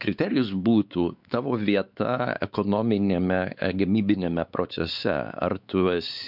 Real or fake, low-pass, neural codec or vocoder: fake; 5.4 kHz; codec, 16 kHz, 4 kbps, X-Codec, WavLM features, trained on Multilingual LibriSpeech